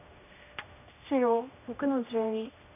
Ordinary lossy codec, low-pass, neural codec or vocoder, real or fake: none; 3.6 kHz; codec, 16 kHz, 1 kbps, X-Codec, HuBERT features, trained on general audio; fake